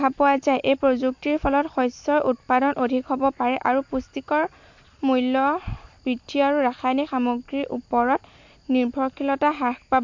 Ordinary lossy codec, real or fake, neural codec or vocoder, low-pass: MP3, 48 kbps; real; none; 7.2 kHz